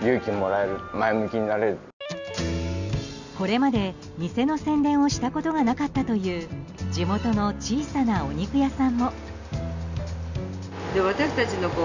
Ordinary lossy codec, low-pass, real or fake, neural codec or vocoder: none; 7.2 kHz; real; none